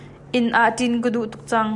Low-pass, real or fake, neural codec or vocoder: 10.8 kHz; real; none